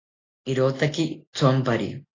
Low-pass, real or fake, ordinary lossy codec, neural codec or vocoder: 7.2 kHz; real; AAC, 32 kbps; none